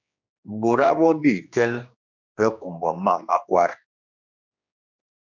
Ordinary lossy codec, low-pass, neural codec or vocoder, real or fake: MP3, 64 kbps; 7.2 kHz; codec, 16 kHz, 2 kbps, X-Codec, HuBERT features, trained on general audio; fake